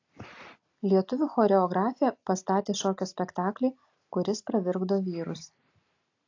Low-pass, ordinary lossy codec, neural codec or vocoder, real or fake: 7.2 kHz; AAC, 48 kbps; none; real